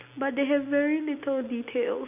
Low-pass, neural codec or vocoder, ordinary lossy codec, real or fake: 3.6 kHz; none; none; real